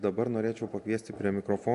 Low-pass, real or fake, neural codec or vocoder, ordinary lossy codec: 10.8 kHz; real; none; AAC, 64 kbps